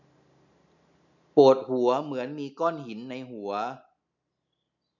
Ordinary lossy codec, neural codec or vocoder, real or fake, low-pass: none; none; real; 7.2 kHz